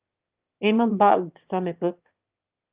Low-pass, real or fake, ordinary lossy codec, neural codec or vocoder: 3.6 kHz; fake; Opus, 32 kbps; autoencoder, 22.05 kHz, a latent of 192 numbers a frame, VITS, trained on one speaker